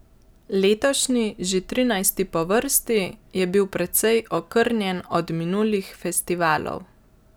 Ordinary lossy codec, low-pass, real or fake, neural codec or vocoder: none; none; real; none